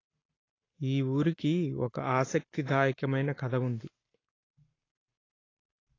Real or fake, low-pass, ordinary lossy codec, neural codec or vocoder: fake; 7.2 kHz; AAC, 32 kbps; codec, 44.1 kHz, 7.8 kbps, Pupu-Codec